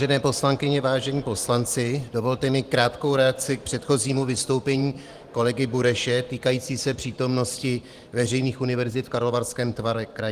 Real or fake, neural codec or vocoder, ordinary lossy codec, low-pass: fake; vocoder, 44.1 kHz, 128 mel bands every 512 samples, BigVGAN v2; Opus, 24 kbps; 14.4 kHz